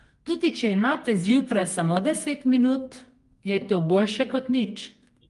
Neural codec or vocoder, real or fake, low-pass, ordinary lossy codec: codec, 24 kHz, 0.9 kbps, WavTokenizer, medium music audio release; fake; 10.8 kHz; Opus, 32 kbps